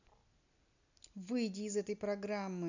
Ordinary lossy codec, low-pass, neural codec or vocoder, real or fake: none; 7.2 kHz; none; real